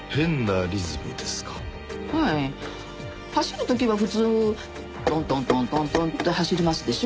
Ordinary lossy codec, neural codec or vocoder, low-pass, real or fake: none; none; none; real